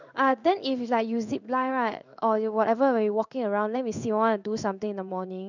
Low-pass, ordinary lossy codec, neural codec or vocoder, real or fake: 7.2 kHz; none; codec, 16 kHz in and 24 kHz out, 1 kbps, XY-Tokenizer; fake